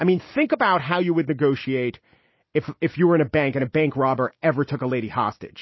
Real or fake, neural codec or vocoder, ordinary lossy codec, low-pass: real; none; MP3, 24 kbps; 7.2 kHz